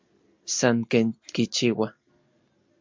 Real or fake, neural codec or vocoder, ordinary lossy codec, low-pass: real; none; MP3, 64 kbps; 7.2 kHz